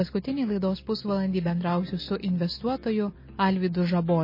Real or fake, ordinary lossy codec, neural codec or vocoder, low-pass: real; MP3, 24 kbps; none; 5.4 kHz